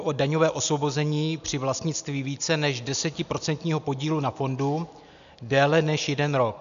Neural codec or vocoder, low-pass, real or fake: none; 7.2 kHz; real